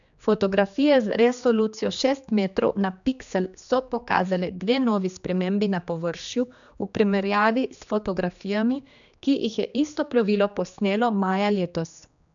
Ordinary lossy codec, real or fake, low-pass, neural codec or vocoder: none; fake; 7.2 kHz; codec, 16 kHz, 2 kbps, X-Codec, HuBERT features, trained on general audio